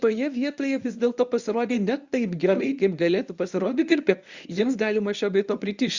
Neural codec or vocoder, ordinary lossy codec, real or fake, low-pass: codec, 24 kHz, 0.9 kbps, WavTokenizer, medium speech release version 1; Opus, 64 kbps; fake; 7.2 kHz